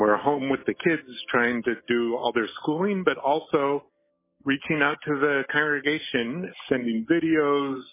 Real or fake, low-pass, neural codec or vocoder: real; 3.6 kHz; none